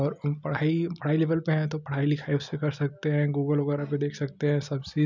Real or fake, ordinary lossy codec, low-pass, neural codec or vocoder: real; none; 7.2 kHz; none